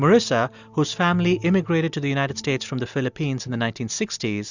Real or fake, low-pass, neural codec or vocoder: real; 7.2 kHz; none